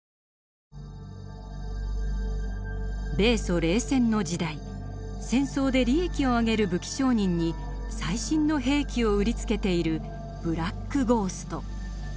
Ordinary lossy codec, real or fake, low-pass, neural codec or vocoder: none; real; none; none